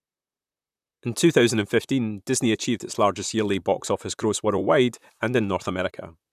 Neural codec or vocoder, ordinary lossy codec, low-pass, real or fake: vocoder, 44.1 kHz, 128 mel bands, Pupu-Vocoder; none; 14.4 kHz; fake